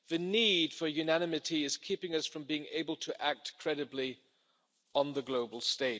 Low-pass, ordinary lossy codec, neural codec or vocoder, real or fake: none; none; none; real